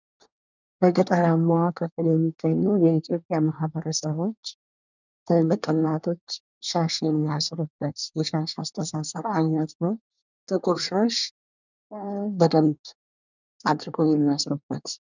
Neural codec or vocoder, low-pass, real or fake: codec, 24 kHz, 1 kbps, SNAC; 7.2 kHz; fake